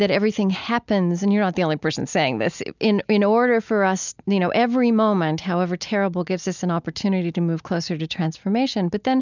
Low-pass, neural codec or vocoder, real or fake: 7.2 kHz; none; real